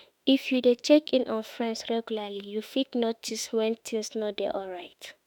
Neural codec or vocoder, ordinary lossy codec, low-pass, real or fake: autoencoder, 48 kHz, 32 numbers a frame, DAC-VAE, trained on Japanese speech; none; 19.8 kHz; fake